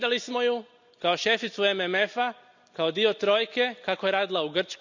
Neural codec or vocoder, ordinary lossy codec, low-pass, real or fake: none; none; 7.2 kHz; real